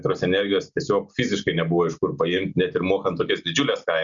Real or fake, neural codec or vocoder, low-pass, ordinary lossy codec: real; none; 7.2 kHz; Opus, 64 kbps